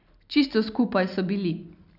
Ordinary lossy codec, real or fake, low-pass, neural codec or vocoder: none; real; 5.4 kHz; none